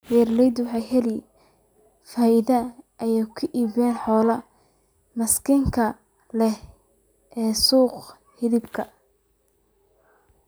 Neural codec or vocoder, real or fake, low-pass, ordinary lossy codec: none; real; none; none